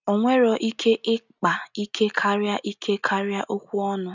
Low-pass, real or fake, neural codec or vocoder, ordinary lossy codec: 7.2 kHz; real; none; none